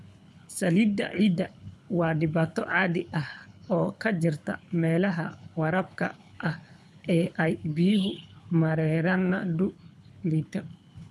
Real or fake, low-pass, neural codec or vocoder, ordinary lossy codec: fake; none; codec, 24 kHz, 6 kbps, HILCodec; none